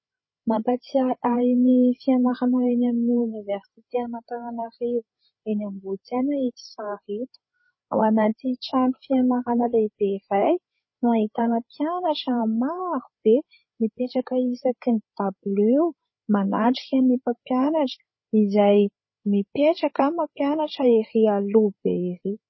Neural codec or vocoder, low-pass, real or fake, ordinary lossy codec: codec, 16 kHz, 8 kbps, FreqCodec, larger model; 7.2 kHz; fake; MP3, 24 kbps